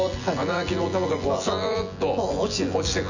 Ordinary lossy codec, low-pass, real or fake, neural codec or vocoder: none; 7.2 kHz; fake; vocoder, 24 kHz, 100 mel bands, Vocos